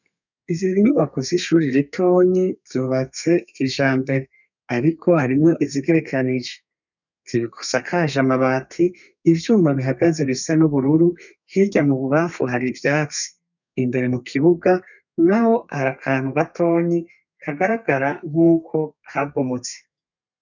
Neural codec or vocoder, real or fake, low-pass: codec, 32 kHz, 1.9 kbps, SNAC; fake; 7.2 kHz